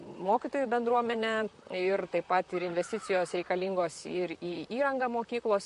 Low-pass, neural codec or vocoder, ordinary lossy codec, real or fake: 14.4 kHz; vocoder, 44.1 kHz, 128 mel bands, Pupu-Vocoder; MP3, 48 kbps; fake